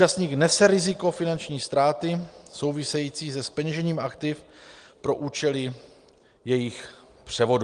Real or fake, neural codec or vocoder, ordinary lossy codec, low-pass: real; none; Opus, 24 kbps; 9.9 kHz